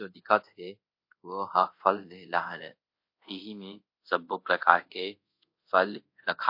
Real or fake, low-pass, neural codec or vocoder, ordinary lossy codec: fake; 5.4 kHz; codec, 24 kHz, 0.5 kbps, DualCodec; MP3, 32 kbps